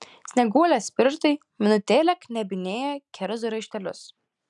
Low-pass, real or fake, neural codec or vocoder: 10.8 kHz; real; none